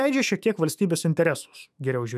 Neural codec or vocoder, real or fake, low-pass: autoencoder, 48 kHz, 128 numbers a frame, DAC-VAE, trained on Japanese speech; fake; 14.4 kHz